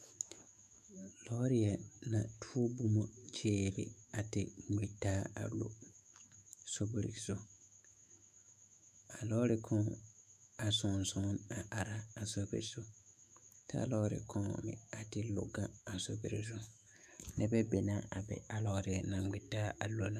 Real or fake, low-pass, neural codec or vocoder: fake; 14.4 kHz; autoencoder, 48 kHz, 128 numbers a frame, DAC-VAE, trained on Japanese speech